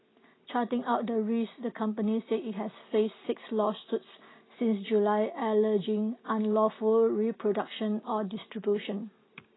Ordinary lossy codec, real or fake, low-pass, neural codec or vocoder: AAC, 16 kbps; real; 7.2 kHz; none